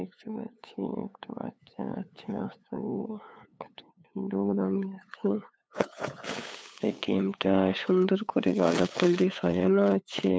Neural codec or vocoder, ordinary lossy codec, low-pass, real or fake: codec, 16 kHz, 8 kbps, FunCodec, trained on LibriTTS, 25 frames a second; none; none; fake